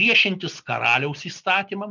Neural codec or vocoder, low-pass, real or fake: none; 7.2 kHz; real